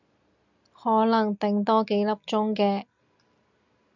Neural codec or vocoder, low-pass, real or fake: none; 7.2 kHz; real